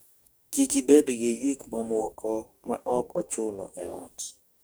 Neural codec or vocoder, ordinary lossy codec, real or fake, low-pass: codec, 44.1 kHz, 2.6 kbps, DAC; none; fake; none